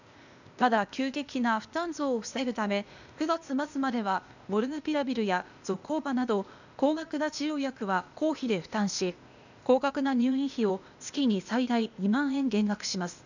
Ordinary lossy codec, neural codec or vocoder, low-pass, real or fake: none; codec, 16 kHz, 0.8 kbps, ZipCodec; 7.2 kHz; fake